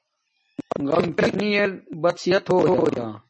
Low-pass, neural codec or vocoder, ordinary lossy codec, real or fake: 9.9 kHz; none; MP3, 32 kbps; real